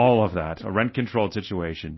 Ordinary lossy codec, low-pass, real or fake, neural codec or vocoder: MP3, 24 kbps; 7.2 kHz; real; none